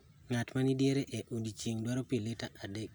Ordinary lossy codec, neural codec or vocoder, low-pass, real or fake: none; none; none; real